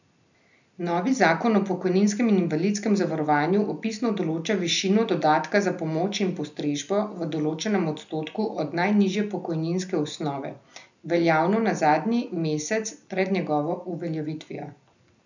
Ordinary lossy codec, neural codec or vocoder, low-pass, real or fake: none; none; 7.2 kHz; real